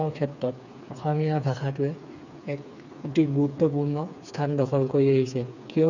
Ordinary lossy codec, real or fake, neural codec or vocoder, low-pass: none; fake; codec, 16 kHz, 4 kbps, FreqCodec, smaller model; 7.2 kHz